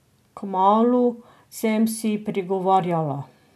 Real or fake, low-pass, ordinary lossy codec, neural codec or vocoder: real; 14.4 kHz; none; none